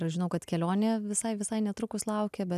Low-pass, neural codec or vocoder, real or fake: 14.4 kHz; none; real